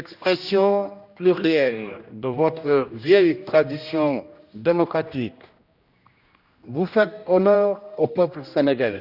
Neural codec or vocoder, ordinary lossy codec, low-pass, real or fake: codec, 16 kHz, 1 kbps, X-Codec, HuBERT features, trained on general audio; Opus, 64 kbps; 5.4 kHz; fake